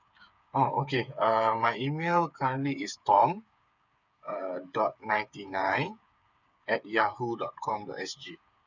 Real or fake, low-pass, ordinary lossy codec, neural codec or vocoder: fake; 7.2 kHz; none; codec, 16 kHz, 8 kbps, FreqCodec, smaller model